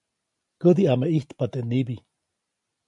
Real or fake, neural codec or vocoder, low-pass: real; none; 10.8 kHz